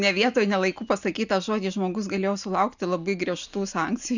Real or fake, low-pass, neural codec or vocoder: real; 7.2 kHz; none